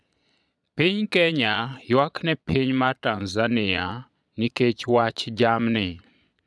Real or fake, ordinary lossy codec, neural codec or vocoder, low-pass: fake; none; vocoder, 22.05 kHz, 80 mel bands, Vocos; none